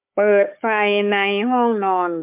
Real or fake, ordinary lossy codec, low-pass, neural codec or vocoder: fake; MP3, 32 kbps; 3.6 kHz; codec, 16 kHz, 4 kbps, FunCodec, trained on Chinese and English, 50 frames a second